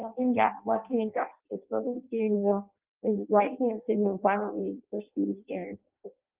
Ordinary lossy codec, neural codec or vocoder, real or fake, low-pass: Opus, 24 kbps; codec, 16 kHz in and 24 kHz out, 0.6 kbps, FireRedTTS-2 codec; fake; 3.6 kHz